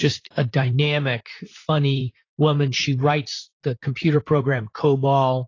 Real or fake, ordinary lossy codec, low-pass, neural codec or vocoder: fake; AAC, 32 kbps; 7.2 kHz; vocoder, 44.1 kHz, 128 mel bands, Pupu-Vocoder